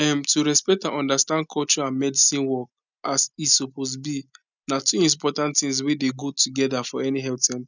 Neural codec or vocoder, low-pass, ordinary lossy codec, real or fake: none; 7.2 kHz; none; real